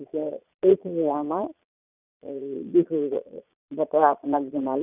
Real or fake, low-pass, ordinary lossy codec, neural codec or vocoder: fake; 3.6 kHz; none; vocoder, 22.05 kHz, 80 mel bands, Vocos